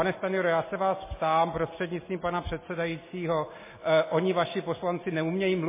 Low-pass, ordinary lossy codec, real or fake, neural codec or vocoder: 3.6 kHz; MP3, 16 kbps; real; none